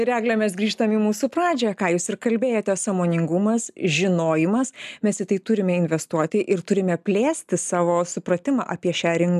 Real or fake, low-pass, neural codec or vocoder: real; 14.4 kHz; none